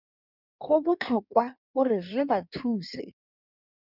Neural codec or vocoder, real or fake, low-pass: codec, 16 kHz in and 24 kHz out, 1.1 kbps, FireRedTTS-2 codec; fake; 5.4 kHz